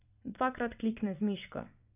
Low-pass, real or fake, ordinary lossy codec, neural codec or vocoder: 3.6 kHz; fake; none; vocoder, 22.05 kHz, 80 mel bands, WaveNeXt